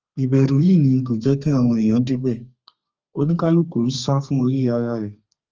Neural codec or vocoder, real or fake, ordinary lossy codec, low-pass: codec, 32 kHz, 1.9 kbps, SNAC; fake; Opus, 24 kbps; 7.2 kHz